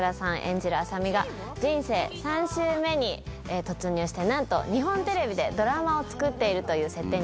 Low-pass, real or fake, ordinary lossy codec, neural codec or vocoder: none; real; none; none